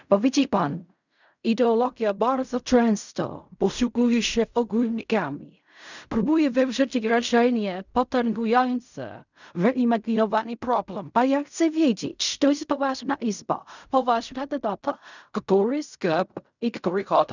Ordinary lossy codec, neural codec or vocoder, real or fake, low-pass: none; codec, 16 kHz in and 24 kHz out, 0.4 kbps, LongCat-Audio-Codec, fine tuned four codebook decoder; fake; 7.2 kHz